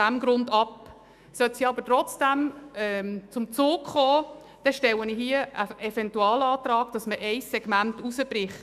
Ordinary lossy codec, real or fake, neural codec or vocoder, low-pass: none; fake; autoencoder, 48 kHz, 128 numbers a frame, DAC-VAE, trained on Japanese speech; 14.4 kHz